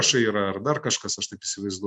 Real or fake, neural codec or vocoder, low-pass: real; none; 10.8 kHz